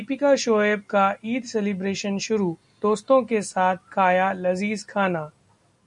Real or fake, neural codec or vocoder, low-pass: real; none; 10.8 kHz